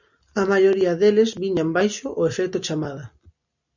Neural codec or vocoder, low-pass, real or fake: none; 7.2 kHz; real